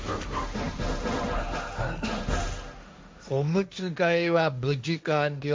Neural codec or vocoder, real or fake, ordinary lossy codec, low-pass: codec, 16 kHz, 1.1 kbps, Voila-Tokenizer; fake; none; none